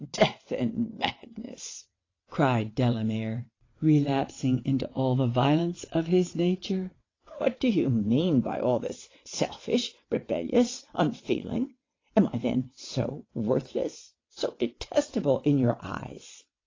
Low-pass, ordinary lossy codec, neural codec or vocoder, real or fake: 7.2 kHz; AAC, 32 kbps; vocoder, 22.05 kHz, 80 mel bands, WaveNeXt; fake